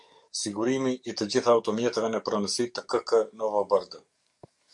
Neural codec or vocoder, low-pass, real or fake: codec, 44.1 kHz, 7.8 kbps, DAC; 10.8 kHz; fake